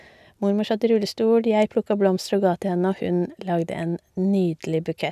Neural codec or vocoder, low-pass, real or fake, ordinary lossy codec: none; 14.4 kHz; real; none